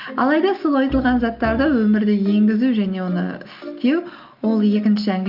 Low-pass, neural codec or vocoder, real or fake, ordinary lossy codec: 5.4 kHz; none; real; Opus, 24 kbps